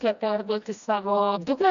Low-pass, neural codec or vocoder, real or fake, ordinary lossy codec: 7.2 kHz; codec, 16 kHz, 1 kbps, FreqCodec, smaller model; fake; MP3, 96 kbps